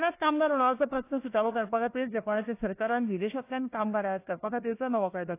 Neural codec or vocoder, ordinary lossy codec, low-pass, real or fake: codec, 16 kHz, 1 kbps, FunCodec, trained on Chinese and English, 50 frames a second; AAC, 24 kbps; 3.6 kHz; fake